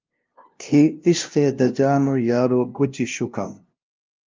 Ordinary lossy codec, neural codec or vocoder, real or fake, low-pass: Opus, 24 kbps; codec, 16 kHz, 0.5 kbps, FunCodec, trained on LibriTTS, 25 frames a second; fake; 7.2 kHz